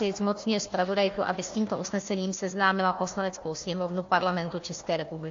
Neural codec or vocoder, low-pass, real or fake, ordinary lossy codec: codec, 16 kHz, 1 kbps, FunCodec, trained on Chinese and English, 50 frames a second; 7.2 kHz; fake; AAC, 48 kbps